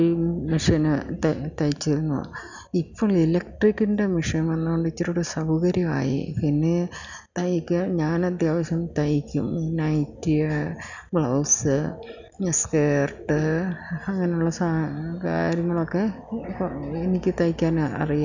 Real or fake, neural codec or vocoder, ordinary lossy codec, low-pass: real; none; none; 7.2 kHz